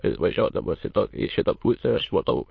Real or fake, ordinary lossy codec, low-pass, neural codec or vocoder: fake; MP3, 32 kbps; 7.2 kHz; autoencoder, 22.05 kHz, a latent of 192 numbers a frame, VITS, trained on many speakers